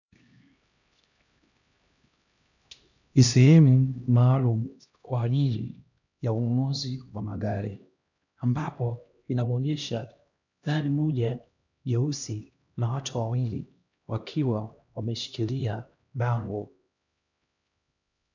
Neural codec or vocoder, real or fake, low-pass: codec, 16 kHz, 1 kbps, X-Codec, HuBERT features, trained on LibriSpeech; fake; 7.2 kHz